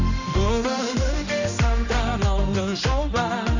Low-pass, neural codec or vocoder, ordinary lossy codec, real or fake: 7.2 kHz; codec, 16 kHz, 1 kbps, X-Codec, HuBERT features, trained on general audio; none; fake